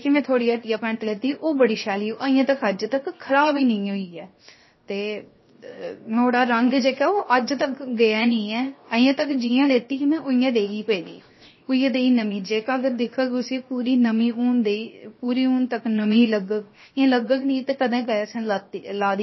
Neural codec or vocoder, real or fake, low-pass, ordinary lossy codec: codec, 16 kHz, 0.7 kbps, FocalCodec; fake; 7.2 kHz; MP3, 24 kbps